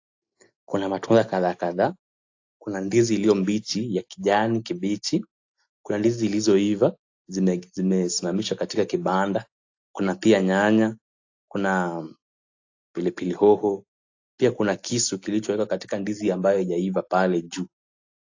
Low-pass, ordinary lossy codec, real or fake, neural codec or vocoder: 7.2 kHz; AAC, 48 kbps; real; none